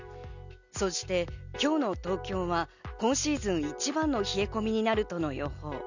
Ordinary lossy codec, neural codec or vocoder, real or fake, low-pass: none; none; real; 7.2 kHz